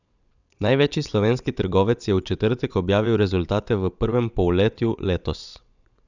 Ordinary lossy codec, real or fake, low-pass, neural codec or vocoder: none; fake; 7.2 kHz; vocoder, 22.05 kHz, 80 mel bands, WaveNeXt